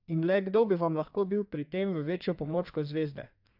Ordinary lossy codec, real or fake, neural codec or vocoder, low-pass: AAC, 48 kbps; fake; codec, 32 kHz, 1.9 kbps, SNAC; 5.4 kHz